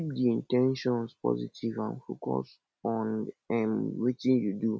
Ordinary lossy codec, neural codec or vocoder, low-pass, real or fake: none; none; none; real